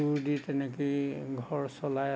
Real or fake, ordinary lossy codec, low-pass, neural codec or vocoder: real; none; none; none